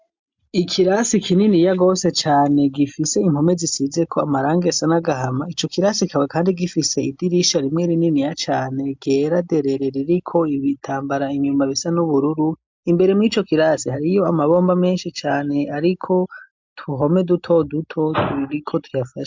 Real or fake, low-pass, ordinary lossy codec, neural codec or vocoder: real; 7.2 kHz; MP3, 64 kbps; none